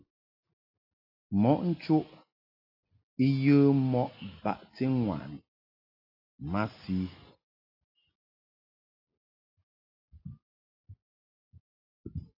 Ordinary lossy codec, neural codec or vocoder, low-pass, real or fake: AAC, 32 kbps; none; 5.4 kHz; real